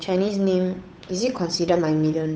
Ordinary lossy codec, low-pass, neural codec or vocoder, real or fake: none; none; codec, 16 kHz, 8 kbps, FunCodec, trained on Chinese and English, 25 frames a second; fake